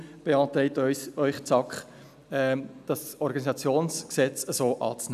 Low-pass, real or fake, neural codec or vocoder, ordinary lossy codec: 14.4 kHz; real; none; none